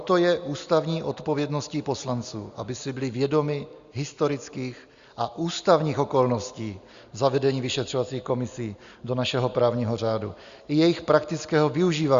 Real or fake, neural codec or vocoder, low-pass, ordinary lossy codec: real; none; 7.2 kHz; Opus, 64 kbps